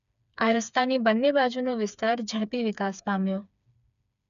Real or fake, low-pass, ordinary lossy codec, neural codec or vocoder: fake; 7.2 kHz; none; codec, 16 kHz, 4 kbps, FreqCodec, smaller model